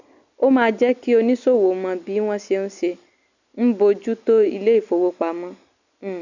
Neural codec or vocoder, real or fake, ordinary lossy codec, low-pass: none; real; none; 7.2 kHz